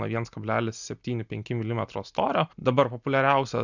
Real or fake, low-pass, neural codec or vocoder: real; 7.2 kHz; none